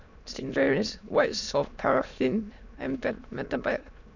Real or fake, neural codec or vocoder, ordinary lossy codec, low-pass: fake; autoencoder, 22.05 kHz, a latent of 192 numbers a frame, VITS, trained on many speakers; none; 7.2 kHz